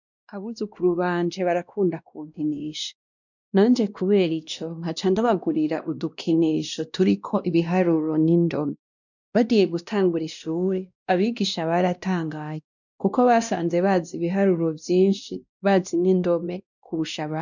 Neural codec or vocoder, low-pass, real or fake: codec, 16 kHz, 1 kbps, X-Codec, WavLM features, trained on Multilingual LibriSpeech; 7.2 kHz; fake